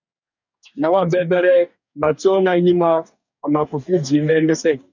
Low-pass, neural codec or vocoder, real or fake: 7.2 kHz; codec, 44.1 kHz, 2.6 kbps, DAC; fake